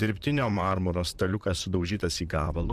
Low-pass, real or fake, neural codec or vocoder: 14.4 kHz; fake; vocoder, 44.1 kHz, 128 mel bands, Pupu-Vocoder